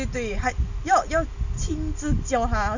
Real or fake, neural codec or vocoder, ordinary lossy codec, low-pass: real; none; none; 7.2 kHz